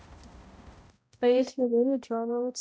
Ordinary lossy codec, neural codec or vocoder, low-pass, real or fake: none; codec, 16 kHz, 0.5 kbps, X-Codec, HuBERT features, trained on balanced general audio; none; fake